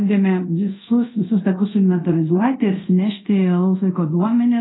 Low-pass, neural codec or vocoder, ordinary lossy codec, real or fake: 7.2 kHz; codec, 24 kHz, 0.5 kbps, DualCodec; AAC, 16 kbps; fake